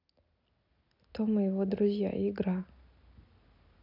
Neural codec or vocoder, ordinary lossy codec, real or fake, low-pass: none; none; real; 5.4 kHz